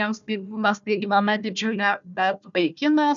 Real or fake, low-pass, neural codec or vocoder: fake; 7.2 kHz; codec, 16 kHz, 1 kbps, FunCodec, trained on Chinese and English, 50 frames a second